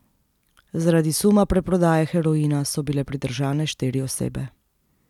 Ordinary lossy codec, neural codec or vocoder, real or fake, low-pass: none; none; real; 19.8 kHz